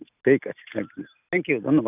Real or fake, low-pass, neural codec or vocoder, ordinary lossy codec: real; 3.6 kHz; none; none